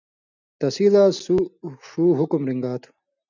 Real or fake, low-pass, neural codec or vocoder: real; 7.2 kHz; none